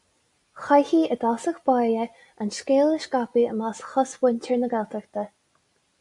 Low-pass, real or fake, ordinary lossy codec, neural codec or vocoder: 10.8 kHz; real; AAC, 64 kbps; none